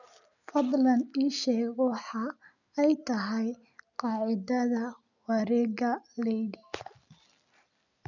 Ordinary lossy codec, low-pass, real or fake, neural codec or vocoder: none; 7.2 kHz; real; none